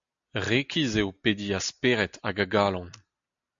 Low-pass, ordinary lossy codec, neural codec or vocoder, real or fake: 7.2 kHz; MP3, 64 kbps; none; real